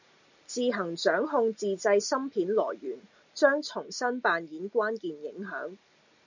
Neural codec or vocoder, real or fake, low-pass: none; real; 7.2 kHz